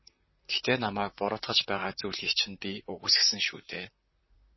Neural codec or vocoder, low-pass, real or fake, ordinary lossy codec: vocoder, 44.1 kHz, 128 mel bands, Pupu-Vocoder; 7.2 kHz; fake; MP3, 24 kbps